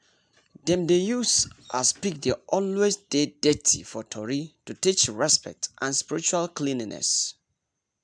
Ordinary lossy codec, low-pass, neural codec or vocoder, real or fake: none; 9.9 kHz; none; real